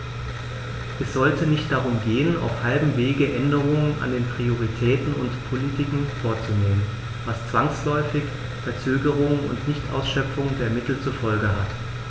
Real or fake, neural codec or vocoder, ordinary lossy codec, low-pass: real; none; none; none